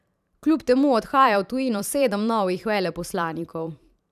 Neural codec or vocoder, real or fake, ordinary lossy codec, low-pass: vocoder, 44.1 kHz, 128 mel bands every 512 samples, BigVGAN v2; fake; none; 14.4 kHz